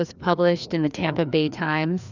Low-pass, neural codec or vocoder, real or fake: 7.2 kHz; codec, 16 kHz, 2 kbps, FreqCodec, larger model; fake